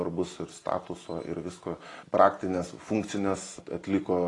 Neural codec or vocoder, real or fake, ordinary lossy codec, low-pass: none; real; AAC, 32 kbps; 10.8 kHz